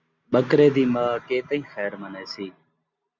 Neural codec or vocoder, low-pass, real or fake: none; 7.2 kHz; real